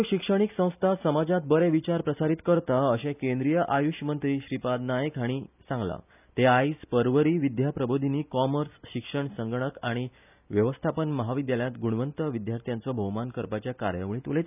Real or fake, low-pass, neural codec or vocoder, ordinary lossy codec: real; 3.6 kHz; none; none